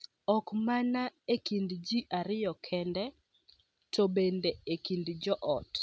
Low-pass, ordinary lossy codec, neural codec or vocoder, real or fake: none; none; none; real